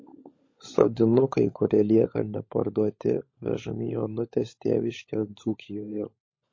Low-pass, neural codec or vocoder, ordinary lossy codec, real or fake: 7.2 kHz; codec, 16 kHz, 16 kbps, FunCodec, trained on LibriTTS, 50 frames a second; MP3, 32 kbps; fake